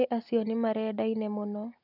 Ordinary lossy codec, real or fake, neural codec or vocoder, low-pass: none; real; none; 5.4 kHz